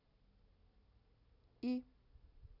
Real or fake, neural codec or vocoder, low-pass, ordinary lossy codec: real; none; 5.4 kHz; none